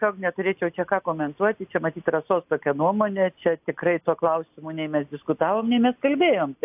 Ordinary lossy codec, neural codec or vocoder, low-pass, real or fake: AAC, 32 kbps; none; 3.6 kHz; real